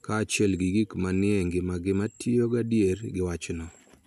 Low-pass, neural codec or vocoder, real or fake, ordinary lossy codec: 14.4 kHz; none; real; none